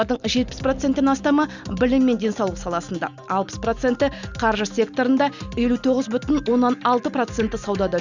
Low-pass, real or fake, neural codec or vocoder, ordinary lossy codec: 7.2 kHz; real; none; Opus, 64 kbps